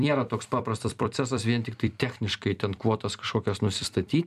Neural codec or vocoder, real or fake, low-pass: vocoder, 44.1 kHz, 128 mel bands every 256 samples, BigVGAN v2; fake; 14.4 kHz